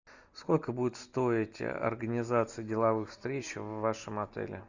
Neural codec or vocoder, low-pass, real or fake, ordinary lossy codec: none; 7.2 kHz; real; Opus, 64 kbps